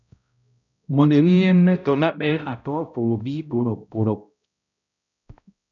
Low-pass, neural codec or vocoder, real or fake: 7.2 kHz; codec, 16 kHz, 0.5 kbps, X-Codec, HuBERT features, trained on balanced general audio; fake